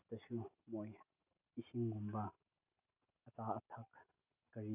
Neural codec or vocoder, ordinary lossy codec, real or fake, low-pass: none; none; real; 3.6 kHz